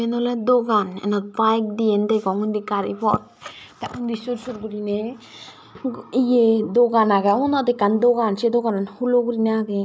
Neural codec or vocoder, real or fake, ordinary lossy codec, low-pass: none; real; none; none